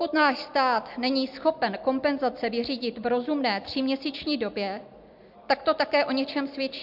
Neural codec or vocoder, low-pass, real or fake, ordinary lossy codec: none; 5.4 kHz; real; MP3, 48 kbps